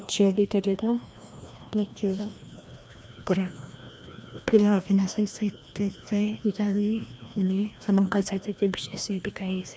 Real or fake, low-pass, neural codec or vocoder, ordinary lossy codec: fake; none; codec, 16 kHz, 1 kbps, FreqCodec, larger model; none